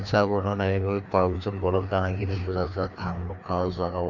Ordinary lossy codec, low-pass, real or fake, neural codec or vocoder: none; 7.2 kHz; fake; codec, 16 kHz, 2 kbps, FreqCodec, larger model